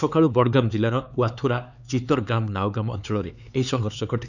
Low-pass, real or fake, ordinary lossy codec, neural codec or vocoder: 7.2 kHz; fake; none; codec, 16 kHz, 4 kbps, X-Codec, HuBERT features, trained on LibriSpeech